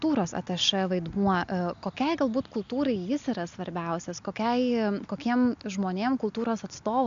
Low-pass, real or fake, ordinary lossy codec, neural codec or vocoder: 7.2 kHz; real; AAC, 64 kbps; none